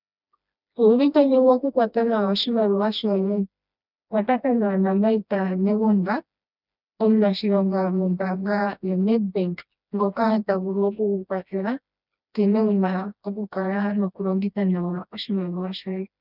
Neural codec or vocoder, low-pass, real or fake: codec, 16 kHz, 1 kbps, FreqCodec, smaller model; 5.4 kHz; fake